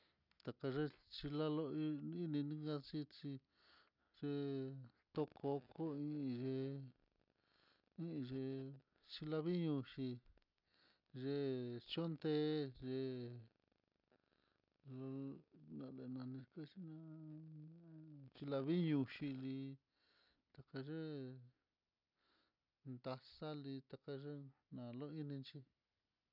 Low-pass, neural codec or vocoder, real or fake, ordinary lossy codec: 5.4 kHz; none; real; none